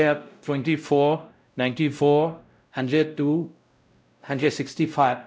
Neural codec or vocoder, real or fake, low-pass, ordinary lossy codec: codec, 16 kHz, 0.5 kbps, X-Codec, WavLM features, trained on Multilingual LibriSpeech; fake; none; none